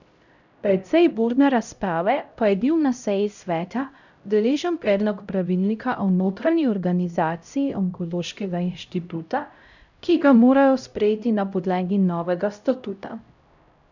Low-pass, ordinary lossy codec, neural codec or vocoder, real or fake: 7.2 kHz; none; codec, 16 kHz, 0.5 kbps, X-Codec, HuBERT features, trained on LibriSpeech; fake